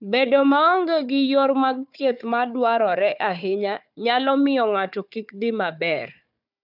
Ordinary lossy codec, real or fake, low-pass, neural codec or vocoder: none; fake; 5.4 kHz; codec, 16 kHz, 4 kbps, FunCodec, trained on Chinese and English, 50 frames a second